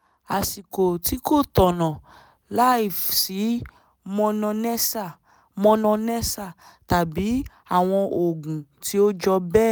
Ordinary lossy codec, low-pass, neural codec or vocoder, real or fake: none; none; none; real